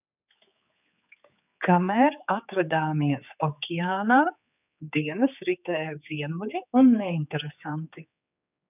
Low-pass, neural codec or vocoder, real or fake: 3.6 kHz; codec, 16 kHz, 4 kbps, X-Codec, HuBERT features, trained on general audio; fake